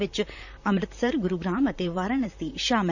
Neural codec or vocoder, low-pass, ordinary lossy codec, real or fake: vocoder, 44.1 kHz, 128 mel bands, Pupu-Vocoder; 7.2 kHz; none; fake